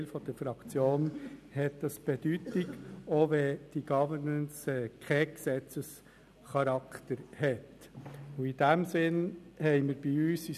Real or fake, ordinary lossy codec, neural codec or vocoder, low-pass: real; none; none; 14.4 kHz